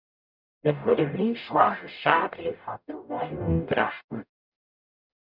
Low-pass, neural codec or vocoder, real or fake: 5.4 kHz; codec, 44.1 kHz, 0.9 kbps, DAC; fake